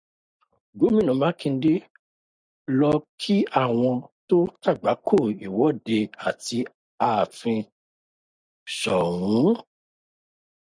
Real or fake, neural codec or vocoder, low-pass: real; none; 9.9 kHz